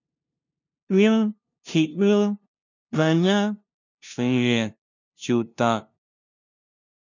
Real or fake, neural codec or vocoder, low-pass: fake; codec, 16 kHz, 0.5 kbps, FunCodec, trained on LibriTTS, 25 frames a second; 7.2 kHz